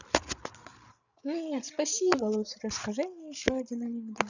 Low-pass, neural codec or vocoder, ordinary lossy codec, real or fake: 7.2 kHz; codec, 16 kHz, 16 kbps, FreqCodec, larger model; none; fake